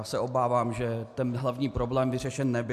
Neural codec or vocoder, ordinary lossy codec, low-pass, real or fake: none; AAC, 96 kbps; 14.4 kHz; real